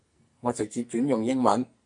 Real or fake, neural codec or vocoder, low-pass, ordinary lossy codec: fake; codec, 44.1 kHz, 2.6 kbps, SNAC; 10.8 kHz; MP3, 96 kbps